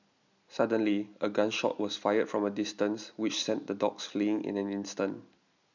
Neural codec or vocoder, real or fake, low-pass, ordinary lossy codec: none; real; 7.2 kHz; none